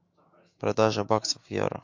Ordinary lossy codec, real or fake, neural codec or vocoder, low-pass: MP3, 48 kbps; fake; autoencoder, 48 kHz, 128 numbers a frame, DAC-VAE, trained on Japanese speech; 7.2 kHz